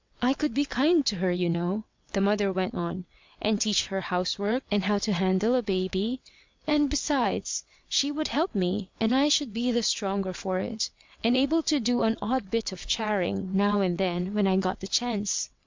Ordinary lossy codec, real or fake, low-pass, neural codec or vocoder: MP3, 64 kbps; fake; 7.2 kHz; vocoder, 22.05 kHz, 80 mel bands, WaveNeXt